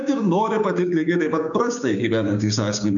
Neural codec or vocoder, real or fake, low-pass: codec, 16 kHz, 6 kbps, DAC; fake; 7.2 kHz